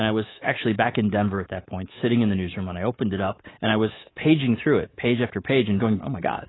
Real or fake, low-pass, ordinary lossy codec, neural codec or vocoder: fake; 7.2 kHz; AAC, 16 kbps; codec, 24 kHz, 3.1 kbps, DualCodec